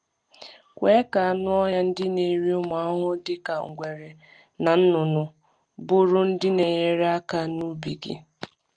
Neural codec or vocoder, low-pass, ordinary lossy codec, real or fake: none; 9.9 kHz; Opus, 32 kbps; real